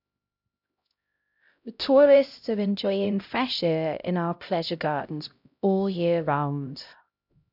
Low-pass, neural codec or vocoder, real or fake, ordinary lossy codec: 5.4 kHz; codec, 16 kHz, 0.5 kbps, X-Codec, HuBERT features, trained on LibriSpeech; fake; none